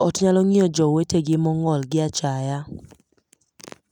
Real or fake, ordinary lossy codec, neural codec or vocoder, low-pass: real; none; none; 19.8 kHz